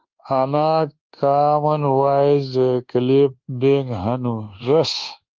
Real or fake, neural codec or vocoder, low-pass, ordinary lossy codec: fake; codec, 24 kHz, 1.2 kbps, DualCodec; 7.2 kHz; Opus, 16 kbps